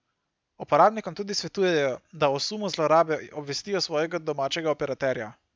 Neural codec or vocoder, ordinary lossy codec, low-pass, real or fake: none; none; none; real